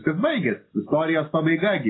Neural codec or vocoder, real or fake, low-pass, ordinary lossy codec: none; real; 7.2 kHz; AAC, 16 kbps